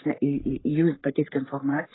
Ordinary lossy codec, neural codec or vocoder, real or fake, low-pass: AAC, 16 kbps; codec, 44.1 kHz, 3.4 kbps, Pupu-Codec; fake; 7.2 kHz